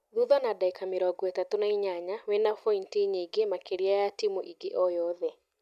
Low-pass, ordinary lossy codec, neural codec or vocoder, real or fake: 14.4 kHz; none; none; real